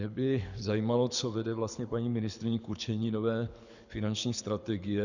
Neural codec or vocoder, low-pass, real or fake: codec, 24 kHz, 6 kbps, HILCodec; 7.2 kHz; fake